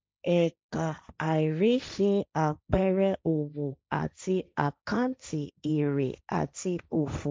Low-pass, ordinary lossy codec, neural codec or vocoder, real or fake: none; none; codec, 16 kHz, 1.1 kbps, Voila-Tokenizer; fake